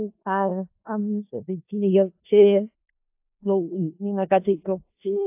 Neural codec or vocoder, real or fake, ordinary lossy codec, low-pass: codec, 16 kHz in and 24 kHz out, 0.4 kbps, LongCat-Audio-Codec, four codebook decoder; fake; AAC, 32 kbps; 3.6 kHz